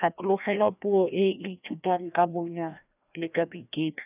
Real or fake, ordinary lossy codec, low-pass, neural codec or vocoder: fake; none; 3.6 kHz; codec, 16 kHz, 1 kbps, FreqCodec, larger model